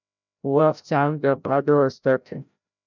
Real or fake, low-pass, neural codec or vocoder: fake; 7.2 kHz; codec, 16 kHz, 0.5 kbps, FreqCodec, larger model